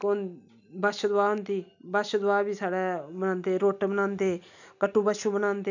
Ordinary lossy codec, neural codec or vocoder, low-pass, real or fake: none; none; 7.2 kHz; real